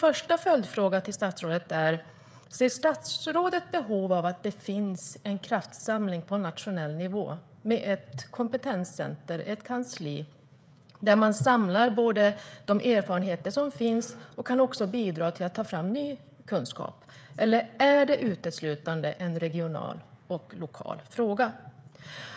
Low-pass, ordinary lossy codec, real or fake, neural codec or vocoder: none; none; fake; codec, 16 kHz, 16 kbps, FreqCodec, smaller model